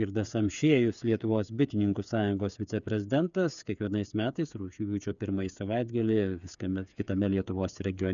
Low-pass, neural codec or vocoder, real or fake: 7.2 kHz; codec, 16 kHz, 8 kbps, FreqCodec, smaller model; fake